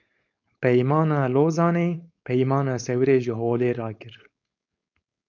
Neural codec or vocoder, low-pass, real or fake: codec, 16 kHz, 4.8 kbps, FACodec; 7.2 kHz; fake